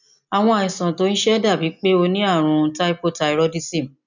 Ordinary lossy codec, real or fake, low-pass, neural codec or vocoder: none; real; 7.2 kHz; none